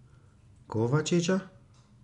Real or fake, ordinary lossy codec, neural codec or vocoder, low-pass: real; none; none; 10.8 kHz